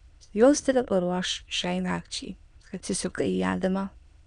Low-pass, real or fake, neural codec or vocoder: 9.9 kHz; fake; autoencoder, 22.05 kHz, a latent of 192 numbers a frame, VITS, trained on many speakers